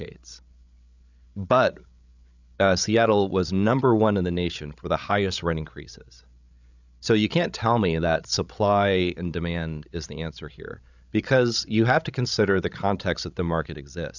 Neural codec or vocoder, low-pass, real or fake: codec, 16 kHz, 16 kbps, FreqCodec, larger model; 7.2 kHz; fake